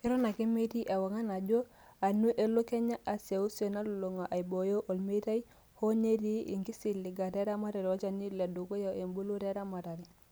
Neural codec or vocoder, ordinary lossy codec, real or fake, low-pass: none; none; real; none